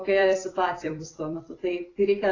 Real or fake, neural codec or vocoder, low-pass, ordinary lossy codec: fake; vocoder, 44.1 kHz, 128 mel bands every 256 samples, BigVGAN v2; 7.2 kHz; AAC, 32 kbps